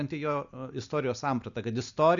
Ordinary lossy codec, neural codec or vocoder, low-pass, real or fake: MP3, 96 kbps; none; 7.2 kHz; real